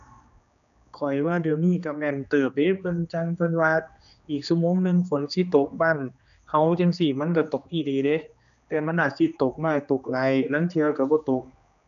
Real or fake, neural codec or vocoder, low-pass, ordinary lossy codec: fake; codec, 16 kHz, 2 kbps, X-Codec, HuBERT features, trained on general audio; 7.2 kHz; none